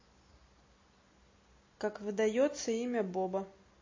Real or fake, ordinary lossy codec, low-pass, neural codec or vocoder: real; MP3, 32 kbps; 7.2 kHz; none